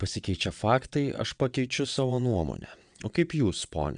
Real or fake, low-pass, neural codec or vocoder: fake; 9.9 kHz; vocoder, 22.05 kHz, 80 mel bands, WaveNeXt